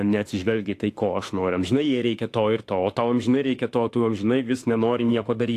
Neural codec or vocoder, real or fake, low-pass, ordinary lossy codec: autoencoder, 48 kHz, 32 numbers a frame, DAC-VAE, trained on Japanese speech; fake; 14.4 kHz; AAC, 64 kbps